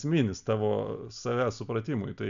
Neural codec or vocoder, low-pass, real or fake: none; 7.2 kHz; real